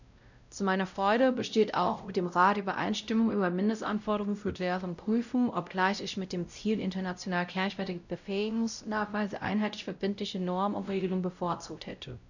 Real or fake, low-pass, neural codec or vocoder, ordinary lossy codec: fake; 7.2 kHz; codec, 16 kHz, 0.5 kbps, X-Codec, WavLM features, trained on Multilingual LibriSpeech; none